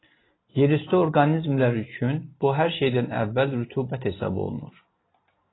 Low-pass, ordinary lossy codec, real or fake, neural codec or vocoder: 7.2 kHz; AAC, 16 kbps; real; none